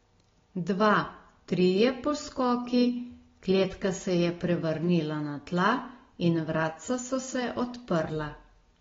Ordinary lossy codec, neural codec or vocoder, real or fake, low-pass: AAC, 24 kbps; none; real; 7.2 kHz